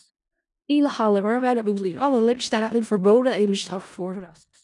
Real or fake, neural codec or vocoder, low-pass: fake; codec, 16 kHz in and 24 kHz out, 0.4 kbps, LongCat-Audio-Codec, four codebook decoder; 10.8 kHz